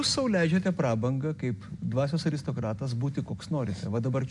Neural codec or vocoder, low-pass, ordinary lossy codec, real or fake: none; 10.8 kHz; MP3, 96 kbps; real